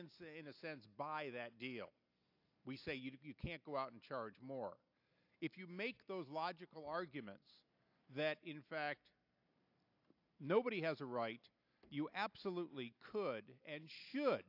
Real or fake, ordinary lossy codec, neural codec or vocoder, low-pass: real; AAC, 48 kbps; none; 5.4 kHz